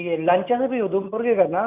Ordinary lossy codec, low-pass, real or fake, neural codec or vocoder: none; 3.6 kHz; real; none